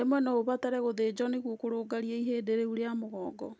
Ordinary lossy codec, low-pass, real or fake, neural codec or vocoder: none; none; real; none